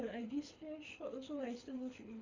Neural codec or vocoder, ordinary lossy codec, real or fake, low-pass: codec, 24 kHz, 6 kbps, HILCodec; MP3, 64 kbps; fake; 7.2 kHz